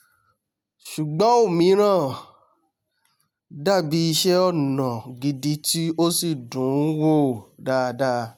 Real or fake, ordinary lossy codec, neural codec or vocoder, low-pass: real; none; none; none